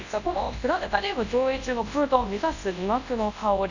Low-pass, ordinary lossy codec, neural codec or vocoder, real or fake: 7.2 kHz; none; codec, 24 kHz, 0.9 kbps, WavTokenizer, large speech release; fake